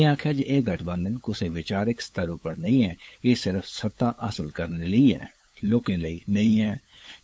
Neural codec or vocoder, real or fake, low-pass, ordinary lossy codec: codec, 16 kHz, 8 kbps, FunCodec, trained on LibriTTS, 25 frames a second; fake; none; none